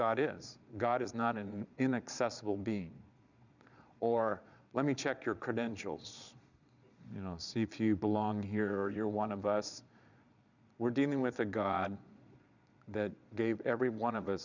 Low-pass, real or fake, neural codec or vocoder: 7.2 kHz; fake; vocoder, 22.05 kHz, 80 mel bands, Vocos